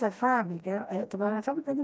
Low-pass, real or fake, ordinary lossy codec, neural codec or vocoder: none; fake; none; codec, 16 kHz, 1 kbps, FreqCodec, smaller model